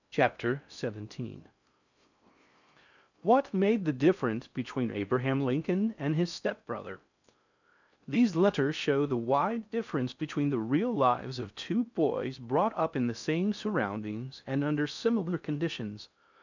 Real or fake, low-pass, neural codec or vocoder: fake; 7.2 kHz; codec, 16 kHz in and 24 kHz out, 0.6 kbps, FocalCodec, streaming, 4096 codes